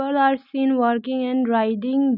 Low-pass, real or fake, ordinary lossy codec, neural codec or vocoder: 5.4 kHz; real; none; none